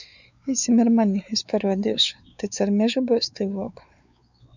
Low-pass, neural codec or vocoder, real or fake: 7.2 kHz; codec, 16 kHz, 4 kbps, X-Codec, WavLM features, trained on Multilingual LibriSpeech; fake